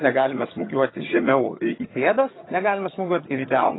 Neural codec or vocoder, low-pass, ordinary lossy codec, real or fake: vocoder, 22.05 kHz, 80 mel bands, HiFi-GAN; 7.2 kHz; AAC, 16 kbps; fake